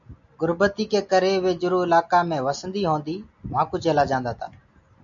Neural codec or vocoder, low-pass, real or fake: none; 7.2 kHz; real